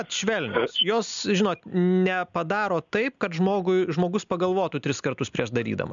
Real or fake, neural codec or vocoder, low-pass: real; none; 7.2 kHz